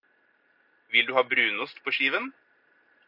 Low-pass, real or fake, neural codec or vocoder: 5.4 kHz; real; none